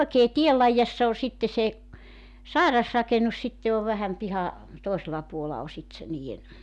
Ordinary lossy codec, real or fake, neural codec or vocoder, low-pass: none; real; none; none